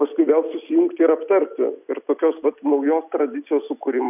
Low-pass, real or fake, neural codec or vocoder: 3.6 kHz; real; none